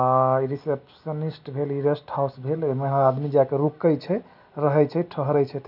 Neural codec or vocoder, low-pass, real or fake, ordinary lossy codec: none; 5.4 kHz; real; none